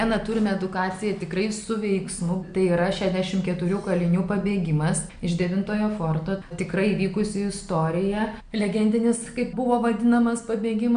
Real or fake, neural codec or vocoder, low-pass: real; none; 9.9 kHz